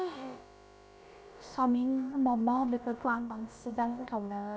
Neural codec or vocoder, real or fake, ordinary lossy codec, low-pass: codec, 16 kHz, about 1 kbps, DyCAST, with the encoder's durations; fake; none; none